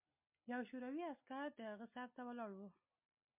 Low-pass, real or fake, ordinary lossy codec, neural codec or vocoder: 3.6 kHz; real; MP3, 32 kbps; none